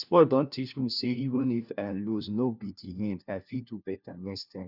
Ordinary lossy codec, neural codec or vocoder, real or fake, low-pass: none; codec, 16 kHz, 1 kbps, FunCodec, trained on LibriTTS, 50 frames a second; fake; 5.4 kHz